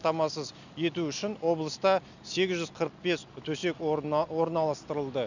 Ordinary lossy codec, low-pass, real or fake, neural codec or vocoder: none; 7.2 kHz; real; none